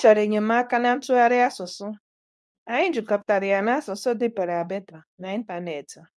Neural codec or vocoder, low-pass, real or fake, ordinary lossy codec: codec, 24 kHz, 0.9 kbps, WavTokenizer, medium speech release version 2; none; fake; none